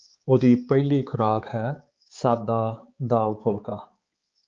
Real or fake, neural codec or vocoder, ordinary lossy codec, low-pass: fake; codec, 16 kHz, 4 kbps, X-Codec, HuBERT features, trained on balanced general audio; Opus, 24 kbps; 7.2 kHz